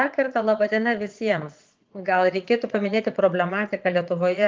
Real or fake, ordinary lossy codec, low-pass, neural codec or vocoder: fake; Opus, 32 kbps; 7.2 kHz; vocoder, 22.05 kHz, 80 mel bands, Vocos